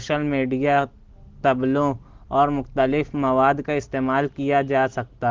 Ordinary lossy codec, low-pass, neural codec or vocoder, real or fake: Opus, 16 kbps; 7.2 kHz; none; real